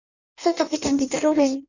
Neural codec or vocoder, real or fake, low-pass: codec, 16 kHz in and 24 kHz out, 0.6 kbps, FireRedTTS-2 codec; fake; 7.2 kHz